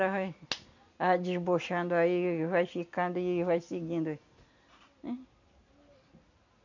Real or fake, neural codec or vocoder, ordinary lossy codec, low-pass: real; none; none; 7.2 kHz